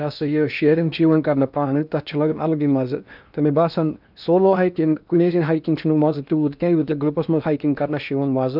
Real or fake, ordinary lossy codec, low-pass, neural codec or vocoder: fake; none; 5.4 kHz; codec, 16 kHz in and 24 kHz out, 0.8 kbps, FocalCodec, streaming, 65536 codes